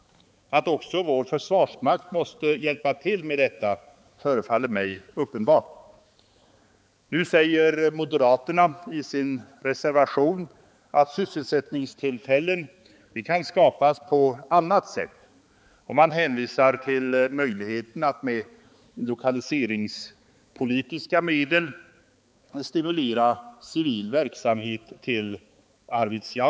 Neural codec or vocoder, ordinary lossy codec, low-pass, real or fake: codec, 16 kHz, 4 kbps, X-Codec, HuBERT features, trained on balanced general audio; none; none; fake